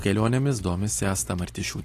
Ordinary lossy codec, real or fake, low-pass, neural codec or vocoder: AAC, 48 kbps; real; 14.4 kHz; none